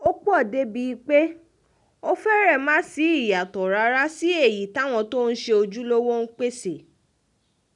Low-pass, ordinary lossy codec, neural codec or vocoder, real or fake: 10.8 kHz; none; none; real